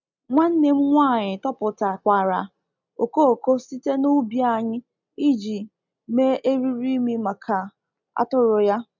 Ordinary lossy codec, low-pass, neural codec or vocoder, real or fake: MP3, 64 kbps; 7.2 kHz; none; real